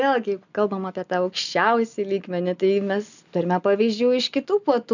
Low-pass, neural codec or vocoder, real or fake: 7.2 kHz; none; real